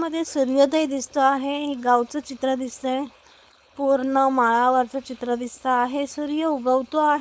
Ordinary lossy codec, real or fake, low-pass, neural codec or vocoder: none; fake; none; codec, 16 kHz, 4.8 kbps, FACodec